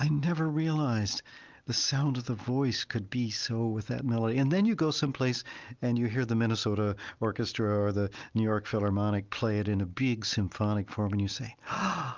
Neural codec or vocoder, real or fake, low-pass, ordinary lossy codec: none; real; 7.2 kHz; Opus, 24 kbps